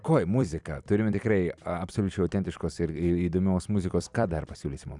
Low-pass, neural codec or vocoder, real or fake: 10.8 kHz; vocoder, 44.1 kHz, 128 mel bands every 256 samples, BigVGAN v2; fake